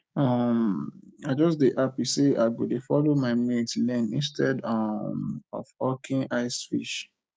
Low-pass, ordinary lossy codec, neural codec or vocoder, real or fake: none; none; codec, 16 kHz, 6 kbps, DAC; fake